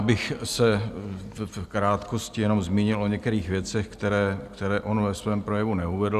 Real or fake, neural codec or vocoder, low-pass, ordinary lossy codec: real; none; 14.4 kHz; Opus, 64 kbps